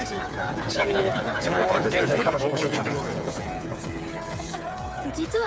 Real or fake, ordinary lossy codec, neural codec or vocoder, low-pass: fake; none; codec, 16 kHz, 8 kbps, FreqCodec, smaller model; none